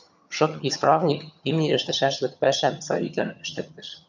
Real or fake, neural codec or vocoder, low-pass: fake; vocoder, 22.05 kHz, 80 mel bands, HiFi-GAN; 7.2 kHz